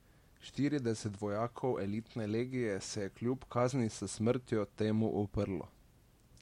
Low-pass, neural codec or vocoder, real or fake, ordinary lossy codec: 19.8 kHz; none; real; MP3, 64 kbps